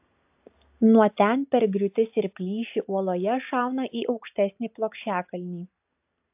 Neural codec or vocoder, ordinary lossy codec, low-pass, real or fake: none; AAC, 32 kbps; 3.6 kHz; real